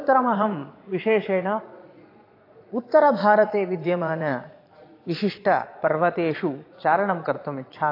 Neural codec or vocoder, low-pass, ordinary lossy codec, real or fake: vocoder, 22.05 kHz, 80 mel bands, Vocos; 5.4 kHz; AAC, 32 kbps; fake